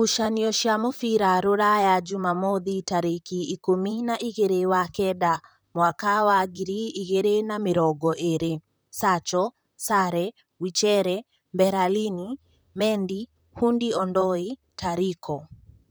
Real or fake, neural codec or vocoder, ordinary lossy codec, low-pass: fake; vocoder, 44.1 kHz, 128 mel bands, Pupu-Vocoder; none; none